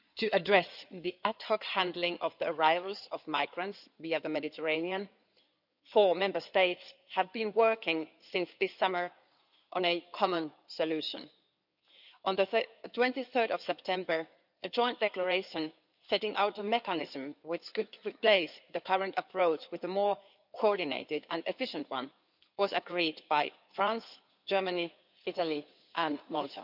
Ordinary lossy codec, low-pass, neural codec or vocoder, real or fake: none; 5.4 kHz; codec, 16 kHz in and 24 kHz out, 2.2 kbps, FireRedTTS-2 codec; fake